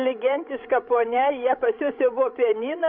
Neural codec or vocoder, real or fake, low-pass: codec, 16 kHz, 16 kbps, FreqCodec, larger model; fake; 5.4 kHz